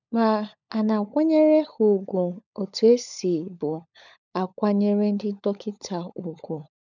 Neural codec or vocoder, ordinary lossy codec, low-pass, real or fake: codec, 16 kHz, 16 kbps, FunCodec, trained on LibriTTS, 50 frames a second; none; 7.2 kHz; fake